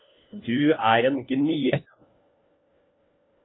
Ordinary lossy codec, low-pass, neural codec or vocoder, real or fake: AAC, 16 kbps; 7.2 kHz; codec, 16 kHz, 1 kbps, FunCodec, trained on LibriTTS, 50 frames a second; fake